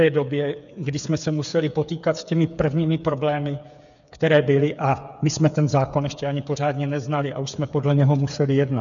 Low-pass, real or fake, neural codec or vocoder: 7.2 kHz; fake; codec, 16 kHz, 8 kbps, FreqCodec, smaller model